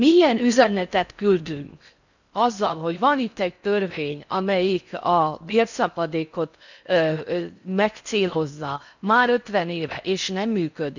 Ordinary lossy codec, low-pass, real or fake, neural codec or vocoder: none; 7.2 kHz; fake; codec, 16 kHz in and 24 kHz out, 0.6 kbps, FocalCodec, streaming, 4096 codes